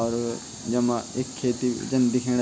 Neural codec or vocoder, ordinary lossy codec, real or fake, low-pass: none; none; real; none